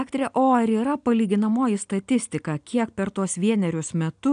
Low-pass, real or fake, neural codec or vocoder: 9.9 kHz; real; none